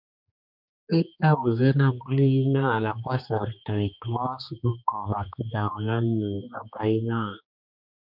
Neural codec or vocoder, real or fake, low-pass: codec, 16 kHz, 2 kbps, X-Codec, HuBERT features, trained on general audio; fake; 5.4 kHz